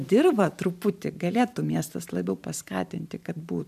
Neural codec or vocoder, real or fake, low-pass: vocoder, 44.1 kHz, 128 mel bands every 512 samples, BigVGAN v2; fake; 14.4 kHz